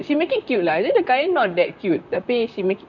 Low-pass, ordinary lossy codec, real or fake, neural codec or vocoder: 7.2 kHz; none; fake; vocoder, 44.1 kHz, 128 mel bands, Pupu-Vocoder